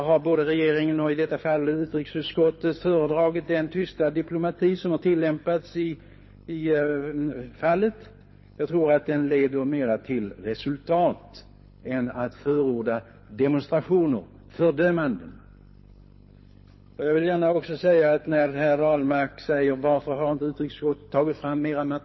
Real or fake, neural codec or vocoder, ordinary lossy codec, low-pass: fake; codec, 24 kHz, 6 kbps, HILCodec; MP3, 24 kbps; 7.2 kHz